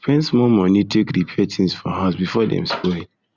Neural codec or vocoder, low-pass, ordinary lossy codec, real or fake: none; 7.2 kHz; Opus, 64 kbps; real